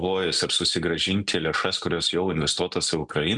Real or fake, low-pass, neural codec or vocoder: real; 10.8 kHz; none